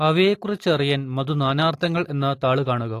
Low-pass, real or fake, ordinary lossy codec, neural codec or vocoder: 14.4 kHz; real; AAC, 48 kbps; none